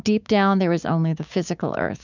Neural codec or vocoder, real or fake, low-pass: codec, 16 kHz, 6 kbps, DAC; fake; 7.2 kHz